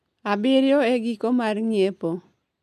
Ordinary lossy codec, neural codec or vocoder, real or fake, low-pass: none; none; real; 14.4 kHz